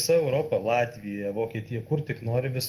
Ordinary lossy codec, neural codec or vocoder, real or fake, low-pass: Opus, 24 kbps; none; real; 14.4 kHz